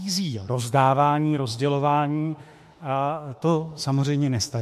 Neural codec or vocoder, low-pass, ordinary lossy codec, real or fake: autoencoder, 48 kHz, 32 numbers a frame, DAC-VAE, trained on Japanese speech; 14.4 kHz; MP3, 64 kbps; fake